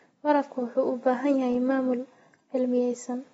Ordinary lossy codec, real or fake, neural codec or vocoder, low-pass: AAC, 24 kbps; real; none; 19.8 kHz